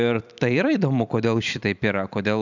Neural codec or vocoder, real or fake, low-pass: vocoder, 44.1 kHz, 128 mel bands every 256 samples, BigVGAN v2; fake; 7.2 kHz